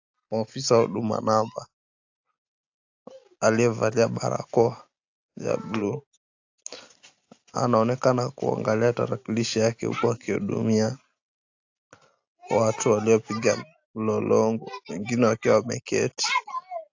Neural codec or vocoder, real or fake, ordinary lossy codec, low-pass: none; real; AAC, 48 kbps; 7.2 kHz